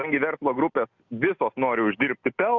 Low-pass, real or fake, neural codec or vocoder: 7.2 kHz; real; none